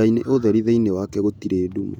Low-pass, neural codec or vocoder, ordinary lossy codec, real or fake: 19.8 kHz; vocoder, 48 kHz, 128 mel bands, Vocos; none; fake